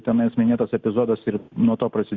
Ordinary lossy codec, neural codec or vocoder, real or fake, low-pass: Opus, 64 kbps; none; real; 7.2 kHz